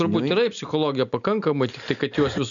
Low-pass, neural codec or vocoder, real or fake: 7.2 kHz; none; real